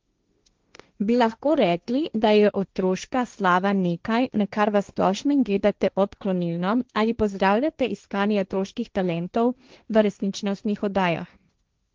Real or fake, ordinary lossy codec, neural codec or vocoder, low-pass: fake; Opus, 24 kbps; codec, 16 kHz, 1.1 kbps, Voila-Tokenizer; 7.2 kHz